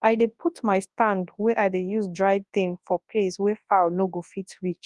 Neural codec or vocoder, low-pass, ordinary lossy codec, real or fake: codec, 24 kHz, 0.9 kbps, WavTokenizer, large speech release; 10.8 kHz; Opus, 32 kbps; fake